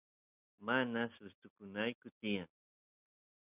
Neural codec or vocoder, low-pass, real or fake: none; 3.6 kHz; real